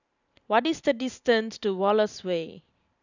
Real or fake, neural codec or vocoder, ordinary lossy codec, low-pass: fake; vocoder, 44.1 kHz, 128 mel bands every 512 samples, BigVGAN v2; none; 7.2 kHz